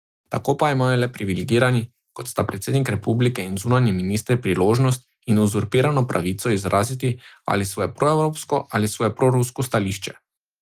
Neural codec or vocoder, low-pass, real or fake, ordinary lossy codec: none; 14.4 kHz; real; Opus, 24 kbps